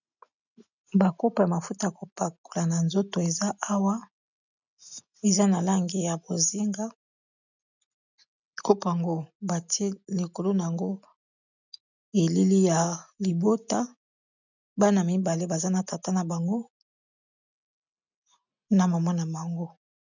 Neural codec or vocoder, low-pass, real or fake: none; 7.2 kHz; real